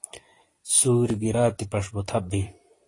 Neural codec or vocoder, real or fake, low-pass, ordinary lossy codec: vocoder, 44.1 kHz, 128 mel bands every 256 samples, BigVGAN v2; fake; 10.8 kHz; AAC, 32 kbps